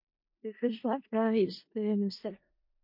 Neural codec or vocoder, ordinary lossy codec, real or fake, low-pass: codec, 16 kHz in and 24 kHz out, 0.4 kbps, LongCat-Audio-Codec, four codebook decoder; MP3, 32 kbps; fake; 5.4 kHz